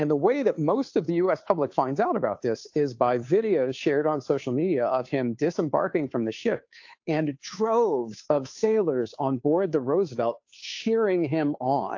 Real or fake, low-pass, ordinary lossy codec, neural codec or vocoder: fake; 7.2 kHz; AAC, 48 kbps; codec, 16 kHz, 2 kbps, FunCodec, trained on Chinese and English, 25 frames a second